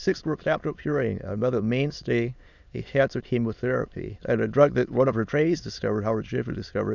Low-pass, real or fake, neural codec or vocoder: 7.2 kHz; fake; autoencoder, 22.05 kHz, a latent of 192 numbers a frame, VITS, trained on many speakers